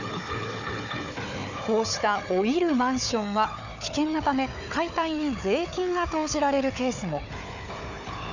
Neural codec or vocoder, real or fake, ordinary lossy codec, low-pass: codec, 16 kHz, 4 kbps, FunCodec, trained on Chinese and English, 50 frames a second; fake; none; 7.2 kHz